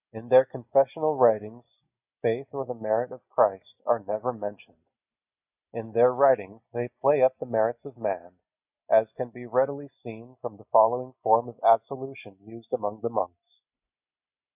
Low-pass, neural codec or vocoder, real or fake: 3.6 kHz; none; real